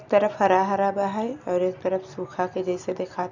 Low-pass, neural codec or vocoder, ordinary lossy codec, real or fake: 7.2 kHz; none; none; real